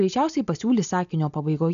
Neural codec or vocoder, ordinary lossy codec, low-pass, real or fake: none; AAC, 96 kbps; 7.2 kHz; real